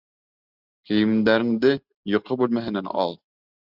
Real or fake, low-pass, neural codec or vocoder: real; 5.4 kHz; none